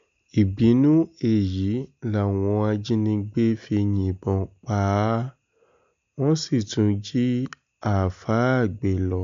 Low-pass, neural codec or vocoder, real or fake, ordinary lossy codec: 7.2 kHz; none; real; MP3, 64 kbps